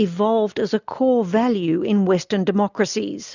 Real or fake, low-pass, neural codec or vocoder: real; 7.2 kHz; none